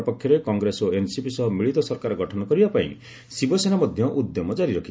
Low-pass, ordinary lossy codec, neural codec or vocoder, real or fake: none; none; none; real